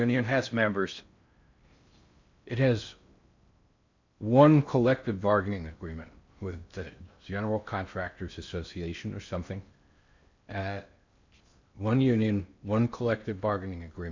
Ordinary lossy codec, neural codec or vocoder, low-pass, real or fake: MP3, 64 kbps; codec, 16 kHz in and 24 kHz out, 0.6 kbps, FocalCodec, streaming, 4096 codes; 7.2 kHz; fake